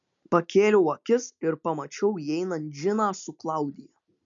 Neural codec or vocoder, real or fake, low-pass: none; real; 7.2 kHz